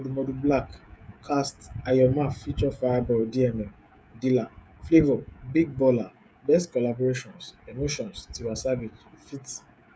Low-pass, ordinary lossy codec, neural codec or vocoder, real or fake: none; none; codec, 16 kHz, 16 kbps, FreqCodec, smaller model; fake